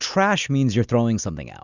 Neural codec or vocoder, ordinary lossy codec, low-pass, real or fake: none; Opus, 64 kbps; 7.2 kHz; real